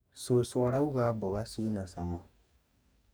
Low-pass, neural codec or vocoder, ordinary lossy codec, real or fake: none; codec, 44.1 kHz, 2.6 kbps, DAC; none; fake